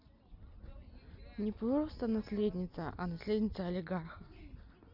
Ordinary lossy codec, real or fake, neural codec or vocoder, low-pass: none; real; none; 5.4 kHz